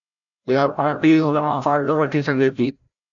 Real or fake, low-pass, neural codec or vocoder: fake; 7.2 kHz; codec, 16 kHz, 0.5 kbps, FreqCodec, larger model